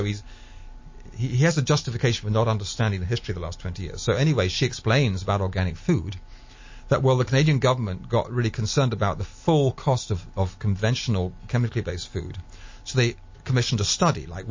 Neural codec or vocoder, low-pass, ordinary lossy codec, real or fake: none; 7.2 kHz; MP3, 32 kbps; real